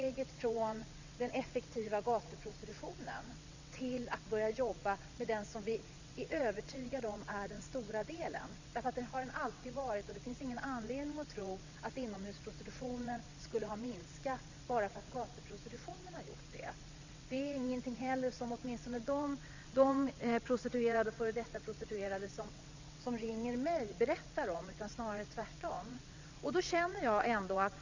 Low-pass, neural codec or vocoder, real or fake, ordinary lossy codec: 7.2 kHz; vocoder, 22.05 kHz, 80 mel bands, WaveNeXt; fake; Opus, 64 kbps